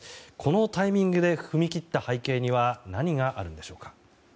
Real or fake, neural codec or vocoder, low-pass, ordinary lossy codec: real; none; none; none